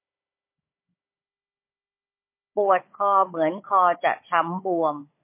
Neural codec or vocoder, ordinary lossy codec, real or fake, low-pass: codec, 16 kHz, 16 kbps, FunCodec, trained on Chinese and English, 50 frames a second; MP3, 24 kbps; fake; 3.6 kHz